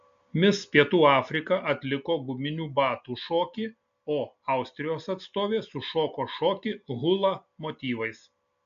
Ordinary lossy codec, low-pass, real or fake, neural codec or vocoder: AAC, 64 kbps; 7.2 kHz; real; none